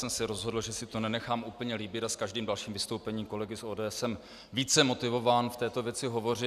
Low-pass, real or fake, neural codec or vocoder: 14.4 kHz; real; none